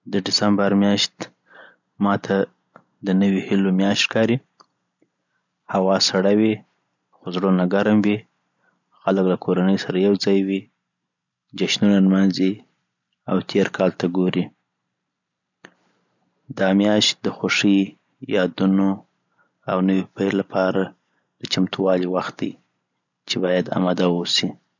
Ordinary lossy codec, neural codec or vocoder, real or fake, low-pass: none; none; real; 7.2 kHz